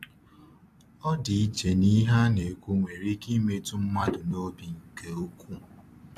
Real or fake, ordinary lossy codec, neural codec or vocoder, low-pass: fake; AAC, 64 kbps; vocoder, 44.1 kHz, 128 mel bands every 256 samples, BigVGAN v2; 14.4 kHz